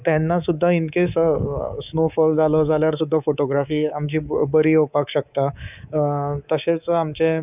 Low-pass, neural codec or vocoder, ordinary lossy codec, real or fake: 3.6 kHz; codec, 24 kHz, 3.1 kbps, DualCodec; none; fake